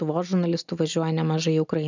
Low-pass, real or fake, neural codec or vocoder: 7.2 kHz; real; none